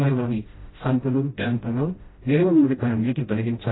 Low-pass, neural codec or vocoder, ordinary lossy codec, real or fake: 7.2 kHz; codec, 16 kHz, 0.5 kbps, FreqCodec, smaller model; AAC, 16 kbps; fake